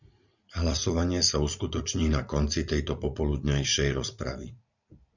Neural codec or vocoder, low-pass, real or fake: vocoder, 44.1 kHz, 80 mel bands, Vocos; 7.2 kHz; fake